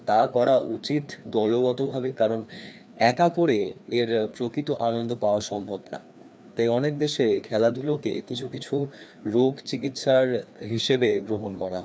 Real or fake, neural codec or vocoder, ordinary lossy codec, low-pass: fake; codec, 16 kHz, 2 kbps, FreqCodec, larger model; none; none